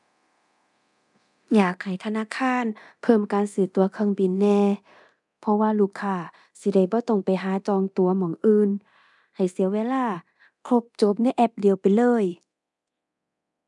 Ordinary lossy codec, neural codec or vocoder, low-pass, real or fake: none; codec, 24 kHz, 0.9 kbps, DualCodec; 10.8 kHz; fake